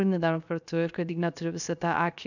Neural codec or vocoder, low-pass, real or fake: codec, 16 kHz, 0.7 kbps, FocalCodec; 7.2 kHz; fake